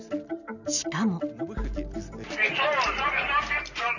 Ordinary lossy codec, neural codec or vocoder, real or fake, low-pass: none; none; real; 7.2 kHz